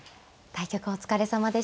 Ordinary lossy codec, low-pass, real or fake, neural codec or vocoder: none; none; real; none